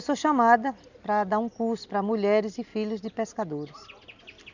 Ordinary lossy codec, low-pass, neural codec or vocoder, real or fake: none; 7.2 kHz; none; real